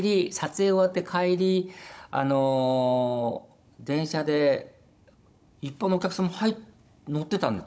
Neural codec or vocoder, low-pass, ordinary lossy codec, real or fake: codec, 16 kHz, 16 kbps, FunCodec, trained on Chinese and English, 50 frames a second; none; none; fake